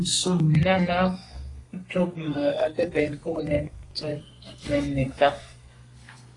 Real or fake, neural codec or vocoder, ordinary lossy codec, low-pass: fake; codec, 32 kHz, 1.9 kbps, SNAC; AAC, 32 kbps; 10.8 kHz